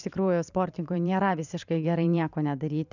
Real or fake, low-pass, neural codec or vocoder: fake; 7.2 kHz; vocoder, 44.1 kHz, 128 mel bands every 512 samples, BigVGAN v2